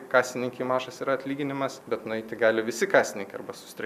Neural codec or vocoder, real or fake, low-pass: none; real; 14.4 kHz